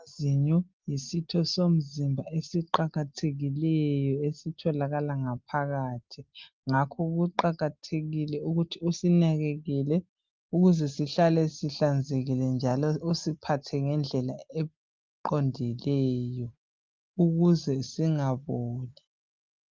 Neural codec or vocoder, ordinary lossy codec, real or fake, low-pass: none; Opus, 32 kbps; real; 7.2 kHz